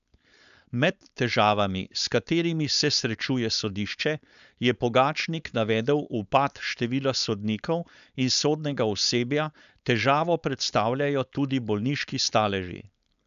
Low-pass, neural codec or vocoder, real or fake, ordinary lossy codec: 7.2 kHz; codec, 16 kHz, 4.8 kbps, FACodec; fake; none